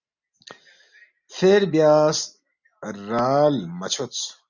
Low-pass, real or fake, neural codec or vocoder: 7.2 kHz; real; none